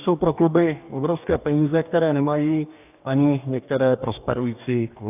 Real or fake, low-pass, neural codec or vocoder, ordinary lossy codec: fake; 3.6 kHz; codec, 44.1 kHz, 2.6 kbps, DAC; AAC, 32 kbps